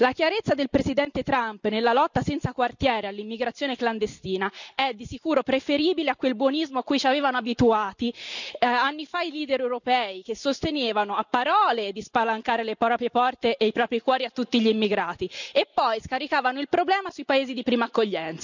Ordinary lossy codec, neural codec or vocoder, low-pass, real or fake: none; none; 7.2 kHz; real